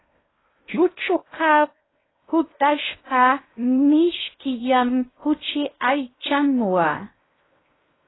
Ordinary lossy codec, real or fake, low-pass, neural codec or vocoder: AAC, 16 kbps; fake; 7.2 kHz; codec, 16 kHz in and 24 kHz out, 0.6 kbps, FocalCodec, streaming, 2048 codes